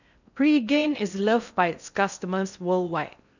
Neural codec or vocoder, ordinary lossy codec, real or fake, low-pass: codec, 16 kHz in and 24 kHz out, 0.6 kbps, FocalCodec, streaming, 4096 codes; none; fake; 7.2 kHz